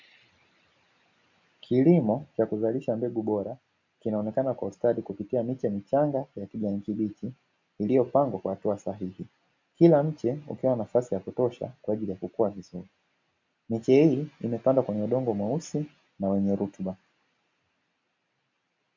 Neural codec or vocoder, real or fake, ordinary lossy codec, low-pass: none; real; MP3, 64 kbps; 7.2 kHz